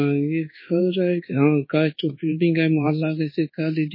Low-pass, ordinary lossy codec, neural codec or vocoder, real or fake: 5.4 kHz; MP3, 24 kbps; codec, 24 kHz, 1.2 kbps, DualCodec; fake